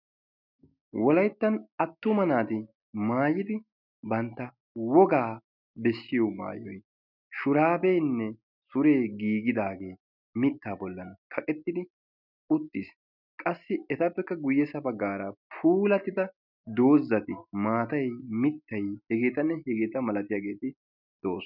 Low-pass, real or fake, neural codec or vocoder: 5.4 kHz; real; none